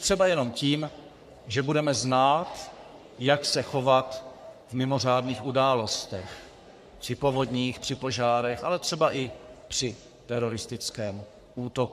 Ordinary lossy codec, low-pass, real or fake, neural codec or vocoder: MP3, 96 kbps; 14.4 kHz; fake; codec, 44.1 kHz, 3.4 kbps, Pupu-Codec